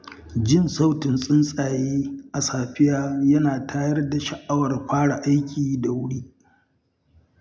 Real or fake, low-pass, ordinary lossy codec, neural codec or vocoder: real; none; none; none